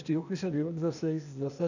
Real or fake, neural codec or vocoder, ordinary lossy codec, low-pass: fake; codec, 16 kHz, 0.8 kbps, ZipCodec; none; 7.2 kHz